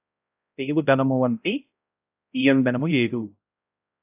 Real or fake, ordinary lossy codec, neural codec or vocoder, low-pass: fake; AAC, 32 kbps; codec, 16 kHz, 0.5 kbps, X-Codec, HuBERT features, trained on balanced general audio; 3.6 kHz